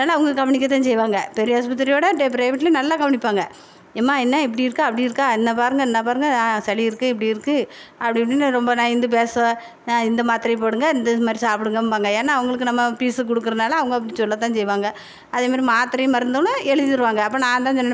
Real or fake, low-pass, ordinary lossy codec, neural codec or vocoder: real; none; none; none